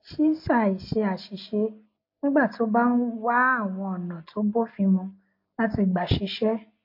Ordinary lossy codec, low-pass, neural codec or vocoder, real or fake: MP3, 32 kbps; 5.4 kHz; none; real